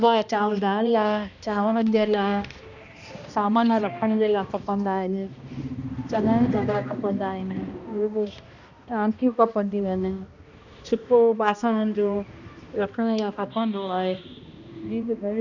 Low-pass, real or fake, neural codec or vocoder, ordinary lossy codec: 7.2 kHz; fake; codec, 16 kHz, 1 kbps, X-Codec, HuBERT features, trained on balanced general audio; none